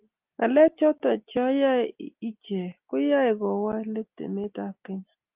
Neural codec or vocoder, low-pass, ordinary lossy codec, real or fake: none; 3.6 kHz; Opus, 24 kbps; real